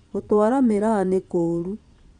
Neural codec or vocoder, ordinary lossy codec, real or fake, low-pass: vocoder, 22.05 kHz, 80 mel bands, Vocos; none; fake; 9.9 kHz